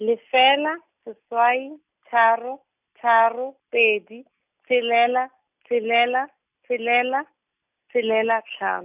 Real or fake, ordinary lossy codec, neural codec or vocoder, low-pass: real; none; none; 3.6 kHz